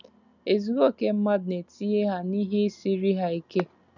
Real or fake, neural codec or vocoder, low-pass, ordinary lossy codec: real; none; 7.2 kHz; none